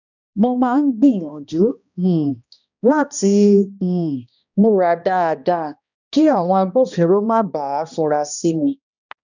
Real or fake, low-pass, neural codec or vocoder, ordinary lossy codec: fake; 7.2 kHz; codec, 16 kHz, 1 kbps, X-Codec, HuBERT features, trained on balanced general audio; none